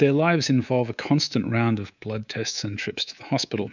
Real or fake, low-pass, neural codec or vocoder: fake; 7.2 kHz; codec, 24 kHz, 3.1 kbps, DualCodec